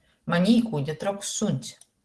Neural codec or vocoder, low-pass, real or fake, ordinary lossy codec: none; 10.8 kHz; real; Opus, 16 kbps